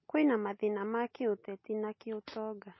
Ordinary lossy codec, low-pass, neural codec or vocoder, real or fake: MP3, 24 kbps; 7.2 kHz; none; real